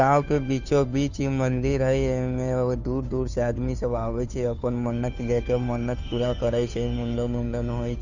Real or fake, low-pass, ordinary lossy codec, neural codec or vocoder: fake; 7.2 kHz; none; codec, 16 kHz, 2 kbps, FunCodec, trained on Chinese and English, 25 frames a second